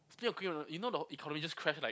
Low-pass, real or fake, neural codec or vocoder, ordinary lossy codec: none; real; none; none